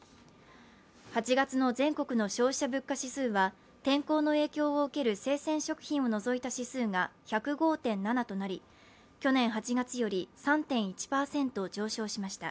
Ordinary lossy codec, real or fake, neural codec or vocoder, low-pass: none; real; none; none